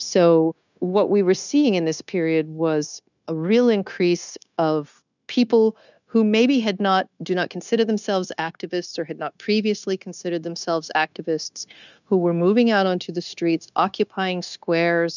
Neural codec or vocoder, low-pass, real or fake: codec, 16 kHz, 0.9 kbps, LongCat-Audio-Codec; 7.2 kHz; fake